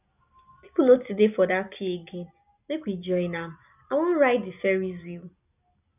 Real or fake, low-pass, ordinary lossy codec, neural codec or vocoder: real; 3.6 kHz; none; none